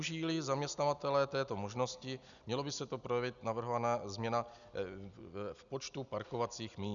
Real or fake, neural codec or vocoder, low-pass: real; none; 7.2 kHz